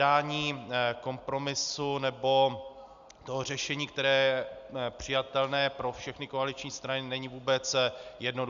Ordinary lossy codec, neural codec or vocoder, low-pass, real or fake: Opus, 64 kbps; none; 7.2 kHz; real